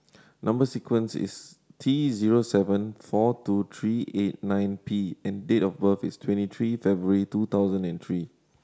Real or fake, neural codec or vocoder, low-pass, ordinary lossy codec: real; none; none; none